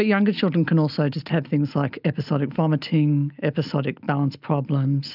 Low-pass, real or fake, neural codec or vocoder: 5.4 kHz; real; none